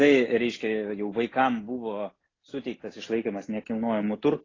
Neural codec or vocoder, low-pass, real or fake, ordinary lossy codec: none; 7.2 kHz; real; AAC, 32 kbps